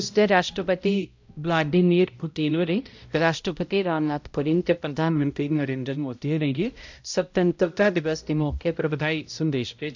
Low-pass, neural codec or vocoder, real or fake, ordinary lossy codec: 7.2 kHz; codec, 16 kHz, 0.5 kbps, X-Codec, HuBERT features, trained on balanced general audio; fake; MP3, 64 kbps